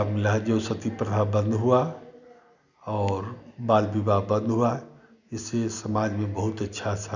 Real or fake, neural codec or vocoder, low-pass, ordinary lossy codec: real; none; 7.2 kHz; none